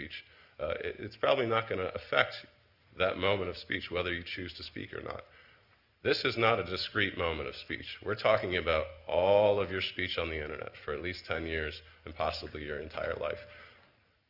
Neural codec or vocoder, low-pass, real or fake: none; 5.4 kHz; real